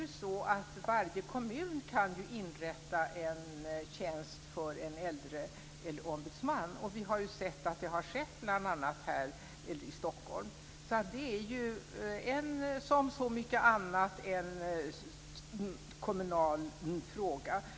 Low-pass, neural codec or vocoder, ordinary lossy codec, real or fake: none; none; none; real